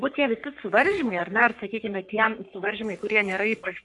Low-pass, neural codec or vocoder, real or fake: 10.8 kHz; codec, 44.1 kHz, 3.4 kbps, Pupu-Codec; fake